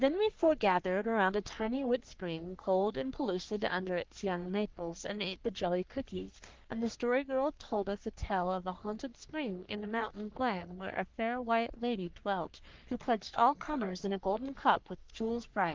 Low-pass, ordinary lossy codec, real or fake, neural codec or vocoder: 7.2 kHz; Opus, 32 kbps; fake; codec, 44.1 kHz, 3.4 kbps, Pupu-Codec